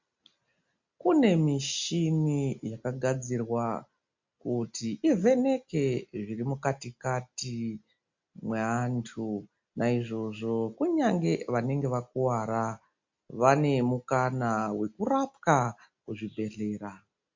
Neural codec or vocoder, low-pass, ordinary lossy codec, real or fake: none; 7.2 kHz; MP3, 48 kbps; real